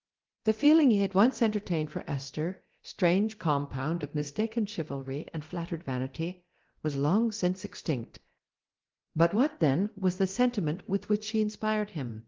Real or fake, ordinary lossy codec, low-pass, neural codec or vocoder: fake; Opus, 16 kbps; 7.2 kHz; codec, 24 kHz, 0.9 kbps, DualCodec